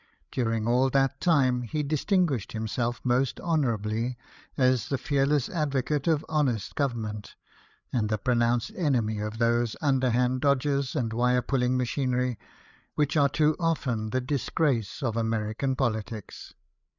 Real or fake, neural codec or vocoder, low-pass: fake; codec, 16 kHz, 8 kbps, FreqCodec, larger model; 7.2 kHz